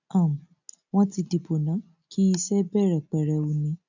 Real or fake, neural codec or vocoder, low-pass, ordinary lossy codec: real; none; 7.2 kHz; none